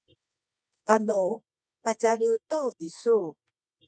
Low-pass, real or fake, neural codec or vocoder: 9.9 kHz; fake; codec, 24 kHz, 0.9 kbps, WavTokenizer, medium music audio release